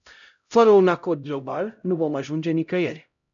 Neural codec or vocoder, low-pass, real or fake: codec, 16 kHz, 0.5 kbps, X-Codec, HuBERT features, trained on LibriSpeech; 7.2 kHz; fake